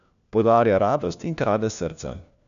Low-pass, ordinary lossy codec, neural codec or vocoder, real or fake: 7.2 kHz; none; codec, 16 kHz, 1 kbps, FunCodec, trained on LibriTTS, 50 frames a second; fake